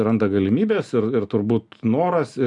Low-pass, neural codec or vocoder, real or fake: 10.8 kHz; none; real